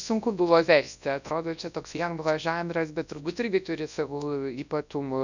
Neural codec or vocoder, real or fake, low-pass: codec, 24 kHz, 0.9 kbps, WavTokenizer, large speech release; fake; 7.2 kHz